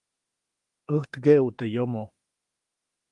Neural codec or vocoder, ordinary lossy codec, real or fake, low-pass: autoencoder, 48 kHz, 32 numbers a frame, DAC-VAE, trained on Japanese speech; Opus, 24 kbps; fake; 10.8 kHz